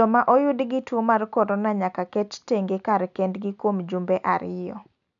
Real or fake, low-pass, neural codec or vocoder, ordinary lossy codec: real; 7.2 kHz; none; none